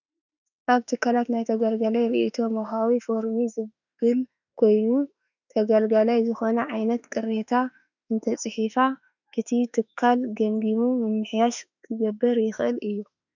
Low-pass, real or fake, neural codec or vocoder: 7.2 kHz; fake; autoencoder, 48 kHz, 32 numbers a frame, DAC-VAE, trained on Japanese speech